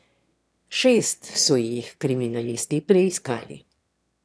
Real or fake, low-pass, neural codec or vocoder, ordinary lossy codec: fake; none; autoencoder, 22.05 kHz, a latent of 192 numbers a frame, VITS, trained on one speaker; none